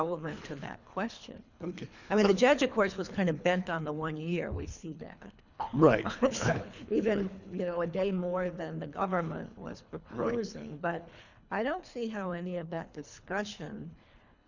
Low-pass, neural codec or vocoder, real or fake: 7.2 kHz; codec, 24 kHz, 3 kbps, HILCodec; fake